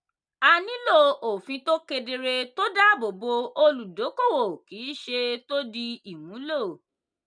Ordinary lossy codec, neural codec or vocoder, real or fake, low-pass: none; none; real; 9.9 kHz